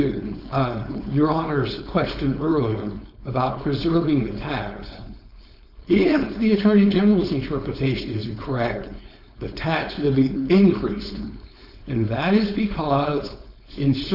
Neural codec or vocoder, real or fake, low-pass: codec, 16 kHz, 4.8 kbps, FACodec; fake; 5.4 kHz